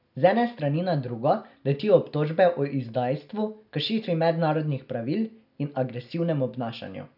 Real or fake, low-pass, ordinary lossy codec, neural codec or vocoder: real; 5.4 kHz; none; none